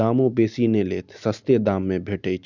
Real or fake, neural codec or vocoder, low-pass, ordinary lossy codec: real; none; 7.2 kHz; none